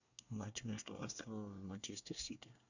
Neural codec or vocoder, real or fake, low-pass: codec, 24 kHz, 1 kbps, SNAC; fake; 7.2 kHz